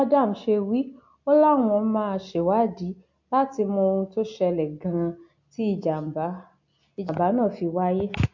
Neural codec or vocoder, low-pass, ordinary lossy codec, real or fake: none; 7.2 kHz; MP3, 48 kbps; real